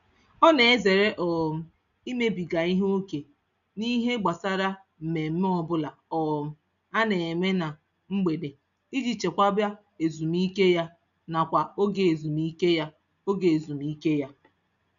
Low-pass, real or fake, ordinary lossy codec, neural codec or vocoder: 7.2 kHz; real; none; none